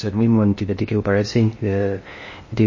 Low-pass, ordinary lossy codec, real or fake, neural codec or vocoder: 7.2 kHz; MP3, 32 kbps; fake; codec, 16 kHz in and 24 kHz out, 0.6 kbps, FocalCodec, streaming, 2048 codes